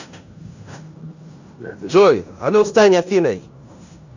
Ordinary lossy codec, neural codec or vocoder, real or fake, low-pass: none; codec, 16 kHz in and 24 kHz out, 0.9 kbps, LongCat-Audio-Codec, fine tuned four codebook decoder; fake; 7.2 kHz